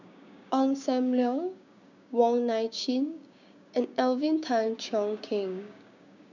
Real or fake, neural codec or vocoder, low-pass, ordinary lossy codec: fake; codec, 16 kHz, 6 kbps, DAC; 7.2 kHz; none